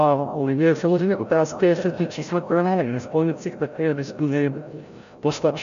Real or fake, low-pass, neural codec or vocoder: fake; 7.2 kHz; codec, 16 kHz, 0.5 kbps, FreqCodec, larger model